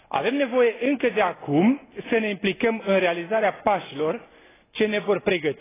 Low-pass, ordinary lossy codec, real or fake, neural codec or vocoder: 3.6 kHz; AAC, 16 kbps; real; none